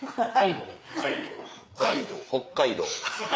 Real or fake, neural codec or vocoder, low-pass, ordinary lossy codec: fake; codec, 16 kHz, 4 kbps, FreqCodec, larger model; none; none